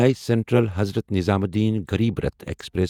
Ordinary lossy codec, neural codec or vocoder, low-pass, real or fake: none; vocoder, 48 kHz, 128 mel bands, Vocos; 19.8 kHz; fake